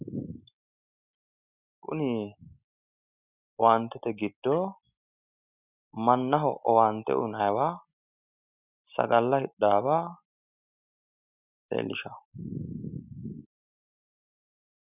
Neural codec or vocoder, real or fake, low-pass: none; real; 3.6 kHz